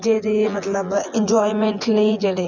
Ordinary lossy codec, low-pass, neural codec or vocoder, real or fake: none; 7.2 kHz; vocoder, 24 kHz, 100 mel bands, Vocos; fake